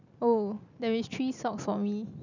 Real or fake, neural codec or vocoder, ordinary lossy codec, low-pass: real; none; none; 7.2 kHz